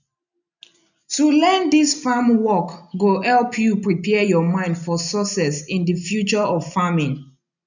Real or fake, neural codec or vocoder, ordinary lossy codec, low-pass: real; none; none; 7.2 kHz